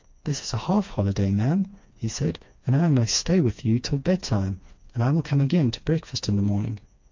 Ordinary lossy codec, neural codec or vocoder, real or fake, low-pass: MP3, 48 kbps; codec, 16 kHz, 2 kbps, FreqCodec, smaller model; fake; 7.2 kHz